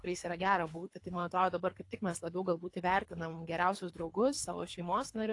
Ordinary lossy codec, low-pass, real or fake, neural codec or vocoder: AAC, 48 kbps; 10.8 kHz; fake; codec, 24 kHz, 3 kbps, HILCodec